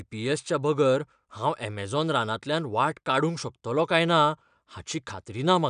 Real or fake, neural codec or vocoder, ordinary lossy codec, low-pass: real; none; none; 9.9 kHz